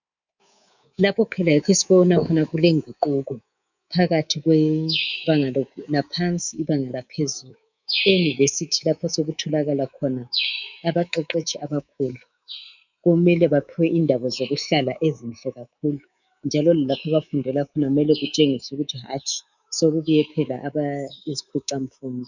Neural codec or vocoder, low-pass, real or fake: codec, 24 kHz, 3.1 kbps, DualCodec; 7.2 kHz; fake